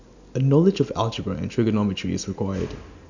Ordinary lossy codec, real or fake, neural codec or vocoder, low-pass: none; real; none; 7.2 kHz